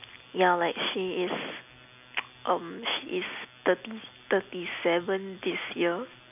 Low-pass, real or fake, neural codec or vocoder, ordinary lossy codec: 3.6 kHz; real; none; none